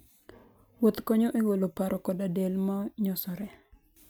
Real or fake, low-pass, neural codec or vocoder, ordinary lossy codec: real; none; none; none